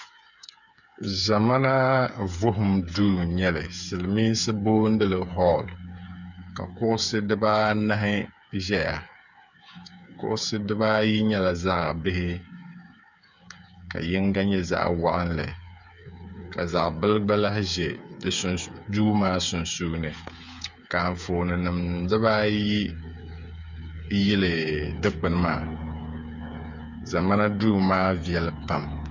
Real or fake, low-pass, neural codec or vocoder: fake; 7.2 kHz; codec, 16 kHz, 8 kbps, FreqCodec, smaller model